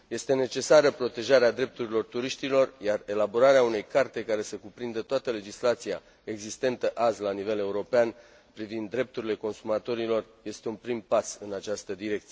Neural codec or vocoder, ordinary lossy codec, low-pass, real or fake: none; none; none; real